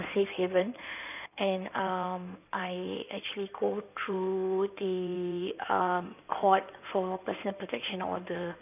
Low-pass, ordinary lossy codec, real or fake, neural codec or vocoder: 3.6 kHz; none; fake; codec, 16 kHz in and 24 kHz out, 2.2 kbps, FireRedTTS-2 codec